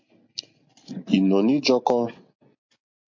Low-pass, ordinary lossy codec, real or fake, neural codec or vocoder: 7.2 kHz; MP3, 48 kbps; real; none